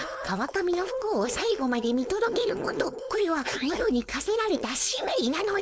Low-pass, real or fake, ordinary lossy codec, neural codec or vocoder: none; fake; none; codec, 16 kHz, 4.8 kbps, FACodec